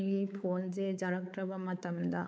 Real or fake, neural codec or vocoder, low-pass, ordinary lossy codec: fake; codec, 16 kHz, 4 kbps, X-Codec, WavLM features, trained on Multilingual LibriSpeech; none; none